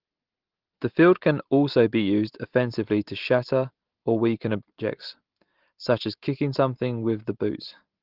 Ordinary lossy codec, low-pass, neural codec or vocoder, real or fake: Opus, 16 kbps; 5.4 kHz; none; real